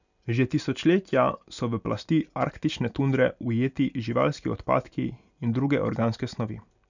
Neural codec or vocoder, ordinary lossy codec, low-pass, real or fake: none; none; 7.2 kHz; real